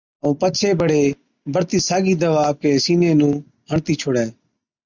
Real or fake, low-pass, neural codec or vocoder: real; 7.2 kHz; none